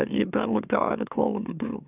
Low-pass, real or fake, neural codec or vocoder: 3.6 kHz; fake; autoencoder, 44.1 kHz, a latent of 192 numbers a frame, MeloTTS